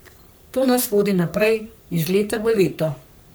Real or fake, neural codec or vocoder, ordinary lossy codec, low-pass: fake; codec, 44.1 kHz, 3.4 kbps, Pupu-Codec; none; none